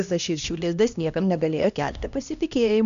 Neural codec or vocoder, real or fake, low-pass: codec, 16 kHz, 1 kbps, X-Codec, HuBERT features, trained on LibriSpeech; fake; 7.2 kHz